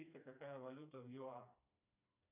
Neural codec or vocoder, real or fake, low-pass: codec, 16 kHz, 2 kbps, FreqCodec, smaller model; fake; 3.6 kHz